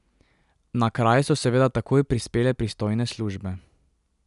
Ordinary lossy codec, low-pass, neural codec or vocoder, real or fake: none; 10.8 kHz; none; real